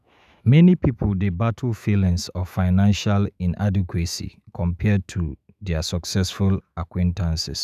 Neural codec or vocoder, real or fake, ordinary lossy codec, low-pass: autoencoder, 48 kHz, 128 numbers a frame, DAC-VAE, trained on Japanese speech; fake; none; 14.4 kHz